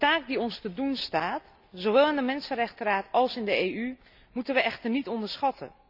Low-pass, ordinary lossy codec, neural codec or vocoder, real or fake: 5.4 kHz; none; none; real